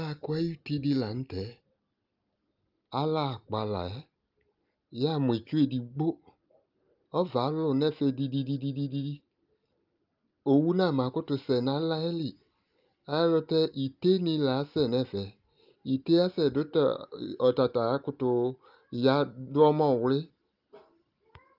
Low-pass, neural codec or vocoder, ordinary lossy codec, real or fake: 5.4 kHz; none; Opus, 24 kbps; real